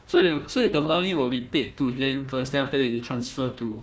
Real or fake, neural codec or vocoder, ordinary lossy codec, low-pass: fake; codec, 16 kHz, 1 kbps, FunCodec, trained on Chinese and English, 50 frames a second; none; none